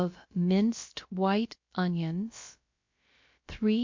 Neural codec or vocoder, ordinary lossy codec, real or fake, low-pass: codec, 16 kHz, about 1 kbps, DyCAST, with the encoder's durations; MP3, 48 kbps; fake; 7.2 kHz